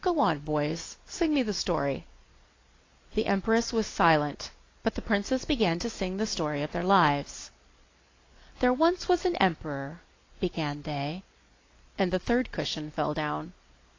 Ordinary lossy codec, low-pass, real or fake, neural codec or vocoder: AAC, 32 kbps; 7.2 kHz; real; none